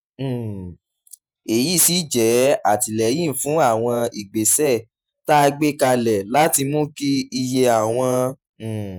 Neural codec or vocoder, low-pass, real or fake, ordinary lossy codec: vocoder, 48 kHz, 128 mel bands, Vocos; none; fake; none